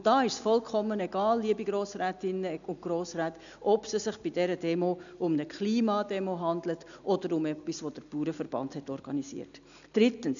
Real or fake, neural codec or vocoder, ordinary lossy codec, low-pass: real; none; none; 7.2 kHz